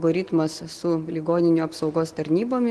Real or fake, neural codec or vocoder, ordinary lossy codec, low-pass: real; none; Opus, 16 kbps; 10.8 kHz